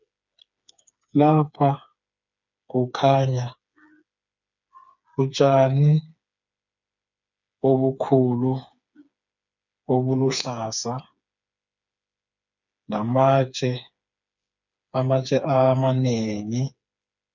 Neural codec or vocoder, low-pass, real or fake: codec, 16 kHz, 4 kbps, FreqCodec, smaller model; 7.2 kHz; fake